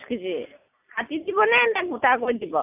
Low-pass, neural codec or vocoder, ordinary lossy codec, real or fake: 3.6 kHz; none; none; real